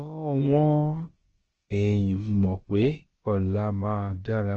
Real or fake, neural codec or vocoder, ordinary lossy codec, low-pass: fake; codec, 16 kHz, about 1 kbps, DyCAST, with the encoder's durations; Opus, 16 kbps; 7.2 kHz